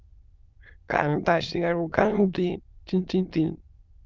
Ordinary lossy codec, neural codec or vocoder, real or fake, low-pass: Opus, 32 kbps; autoencoder, 22.05 kHz, a latent of 192 numbers a frame, VITS, trained on many speakers; fake; 7.2 kHz